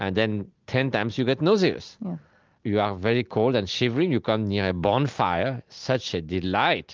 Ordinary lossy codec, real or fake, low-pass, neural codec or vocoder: Opus, 32 kbps; real; 7.2 kHz; none